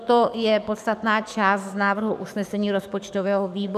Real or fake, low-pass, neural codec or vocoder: fake; 14.4 kHz; codec, 44.1 kHz, 7.8 kbps, DAC